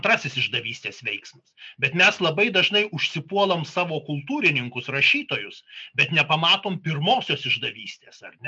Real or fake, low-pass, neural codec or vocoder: real; 9.9 kHz; none